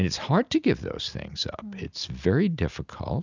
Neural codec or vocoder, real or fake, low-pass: none; real; 7.2 kHz